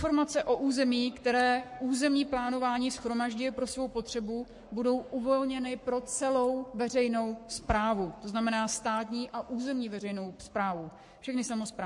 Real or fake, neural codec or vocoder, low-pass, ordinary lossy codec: fake; codec, 44.1 kHz, 7.8 kbps, Pupu-Codec; 10.8 kHz; MP3, 48 kbps